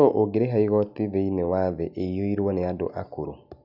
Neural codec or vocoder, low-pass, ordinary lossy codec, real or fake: none; 5.4 kHz; none; real